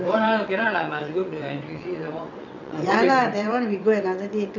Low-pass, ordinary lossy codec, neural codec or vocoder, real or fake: 7.2 kHz; none; vocoder, 22.05 kHz, 80 mel bands, WaveNeXt; fake